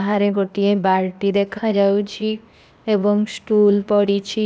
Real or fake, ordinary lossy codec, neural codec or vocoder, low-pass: fake; none; codec, 16 kHz, 0.8 kbps, ZipCodec; none